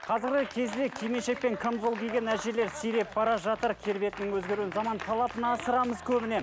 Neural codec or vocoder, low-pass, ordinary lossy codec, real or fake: none; none; none; real